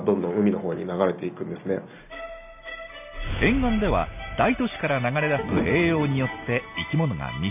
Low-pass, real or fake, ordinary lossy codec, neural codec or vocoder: 3.6 kHz; real; none; none